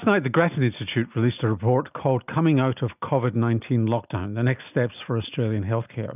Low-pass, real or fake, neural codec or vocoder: 3.6 kHz; real; none